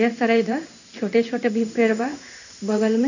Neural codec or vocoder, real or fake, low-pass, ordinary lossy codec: codec, 16 kHz in and 24 kHz out, 1 kbps, XY-Tokenizer; fake; 7.2 kHz; none